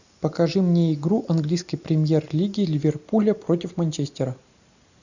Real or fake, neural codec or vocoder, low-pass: real; none; 7.2 kHz